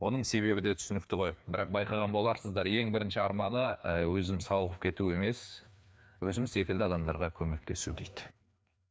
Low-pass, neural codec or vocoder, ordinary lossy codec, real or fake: none; codec, 16 kHz, 2 kbps, FreqCodec, larger model; none; fake